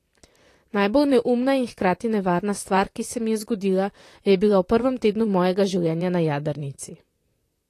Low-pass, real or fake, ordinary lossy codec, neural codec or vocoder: 14.4 kHz; fake; AAC, 48 kbps; vocoder, 44.1 kHz, 128 mel bands, Pupu-Vocoder